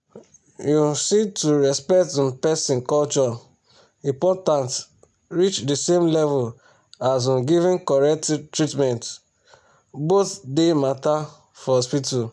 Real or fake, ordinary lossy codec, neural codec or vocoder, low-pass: real; none; none; none